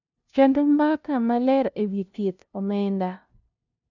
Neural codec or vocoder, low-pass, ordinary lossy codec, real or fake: codec, 16 kHz, 0.5 kbps, FunCodec, trained on LibriTTS, 25 frames a second; 7.2 kHz; none; fake